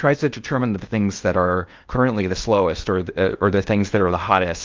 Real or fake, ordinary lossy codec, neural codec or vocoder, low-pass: fake; Opus, 24 kbps; codec, 16 kHz in and 24 kHz out, 0.8 kbps, FocalCodec, streaming, 65536 codes; 7.2 kHz